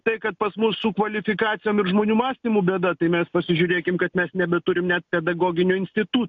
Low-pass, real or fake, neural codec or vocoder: 7.2 kHz; real; none